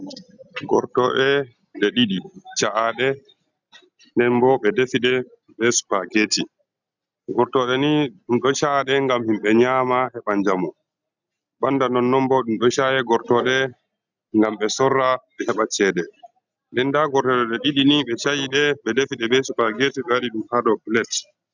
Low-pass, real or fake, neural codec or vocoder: 7.2 kHz; real; none